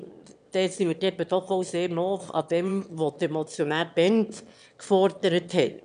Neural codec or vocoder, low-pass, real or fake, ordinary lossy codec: autoencoder, 22.05 kHz, a latent of 192 numbers a frame, VITS, trained on one speaker; 9.9 kHz; fake; none